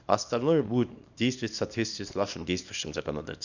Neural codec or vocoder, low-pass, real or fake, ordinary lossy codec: codec, 24 kHz, 0.9 kbps, WavTokenizer, small release; 7.2 kHz; fake; none